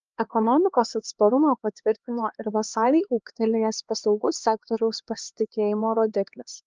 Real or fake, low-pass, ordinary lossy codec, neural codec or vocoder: fake; 7.2 kHz; Opus, 24 kbps; codec, 16 kHz, 2 kbps, X-Codec, HuBERT features, trained on LibriSpeech